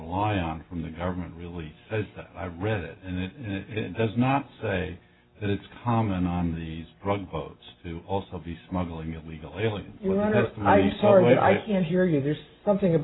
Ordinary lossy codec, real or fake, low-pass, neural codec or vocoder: AAC, 16 kbps; real; 7.2 kHz; none